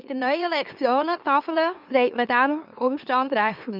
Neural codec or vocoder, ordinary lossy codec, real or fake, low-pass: autoencoder, 44.1 kHz, a latent of 192 numbers a frame, MeloTTS; none; fake; 5.4 kHz